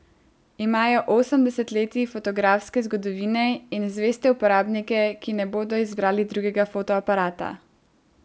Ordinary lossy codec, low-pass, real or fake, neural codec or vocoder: none; none; real; none